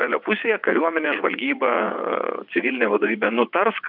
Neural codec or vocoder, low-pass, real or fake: vocoder, 22.05 kHz, 80 mel bands, WaveNeXt; 5.4 kHz; fake